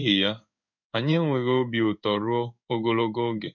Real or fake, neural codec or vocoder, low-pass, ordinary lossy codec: fake; codec, 16 kHz in and 24 kHz out, 1 kbps, XY-Tokenizer; 7.2 kHz; none